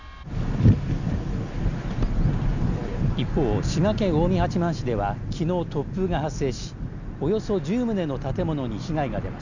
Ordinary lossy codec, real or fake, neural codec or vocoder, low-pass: none; real; none; 7.2 kHz